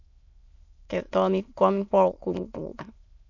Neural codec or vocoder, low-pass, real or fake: autoencoder, 22.05 kHz, a latent of 192 numbers a frame, VITS, trained on many speakers; 7.2 kHz; fake